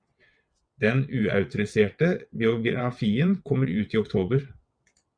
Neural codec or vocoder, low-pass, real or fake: vocoder, 22.05 kHz, 80 mel bands, WaveNeXt; 9.9 kHz; fake